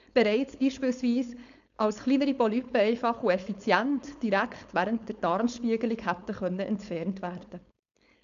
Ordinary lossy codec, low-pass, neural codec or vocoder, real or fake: none; 7.2 kHz; codec, 16 kHz, 4.8 kbps, FACodec; fake